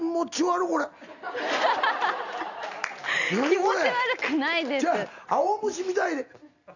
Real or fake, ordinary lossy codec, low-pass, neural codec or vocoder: real; none; 7.2 kHz; none